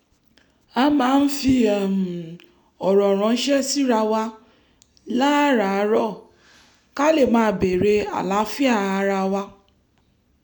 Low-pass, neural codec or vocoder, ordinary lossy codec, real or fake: 19.8 kHz; none; none; real